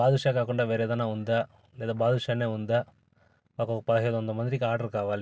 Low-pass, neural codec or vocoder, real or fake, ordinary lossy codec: none; none; real; none